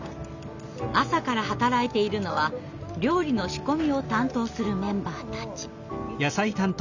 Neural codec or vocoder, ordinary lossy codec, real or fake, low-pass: none; none; real; 7.2 kHz